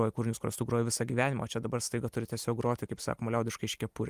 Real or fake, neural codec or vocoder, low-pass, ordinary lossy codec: real; none; 14.4 kHz; Opus, 32 kbps